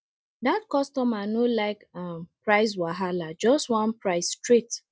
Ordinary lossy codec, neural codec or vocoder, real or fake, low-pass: none; none; real; none